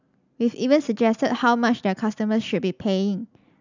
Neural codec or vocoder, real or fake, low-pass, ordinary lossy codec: none; real; 7.2 kHz; none